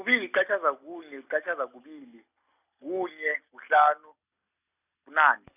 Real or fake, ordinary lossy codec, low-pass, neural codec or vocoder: real; none; 3.6 kHz; none